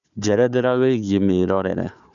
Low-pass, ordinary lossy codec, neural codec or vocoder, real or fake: 7.2 kHz; none; codec, 16 kHz, 4 kbps, FunCodec, trained on Chinese and English, 50 frames a second; fake